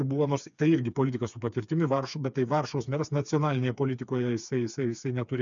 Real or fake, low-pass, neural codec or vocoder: fake; 7.2 kHz; codec, 16 kHz, 4 kbps, FreqCodec, smaller model